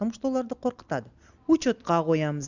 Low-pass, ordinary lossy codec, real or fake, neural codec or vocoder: 7.2 kHz; Opus, 64 kbps; real; none